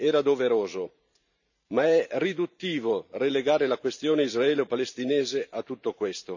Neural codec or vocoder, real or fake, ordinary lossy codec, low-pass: vocoder, 44.1 kHz, 128 mel bands every 512 samples, BigVGAN v2; fake; none; 7.2 kHz